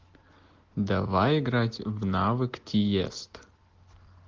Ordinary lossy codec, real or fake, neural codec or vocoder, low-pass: Opus, 16 kbps; real; none; 7.2 kHz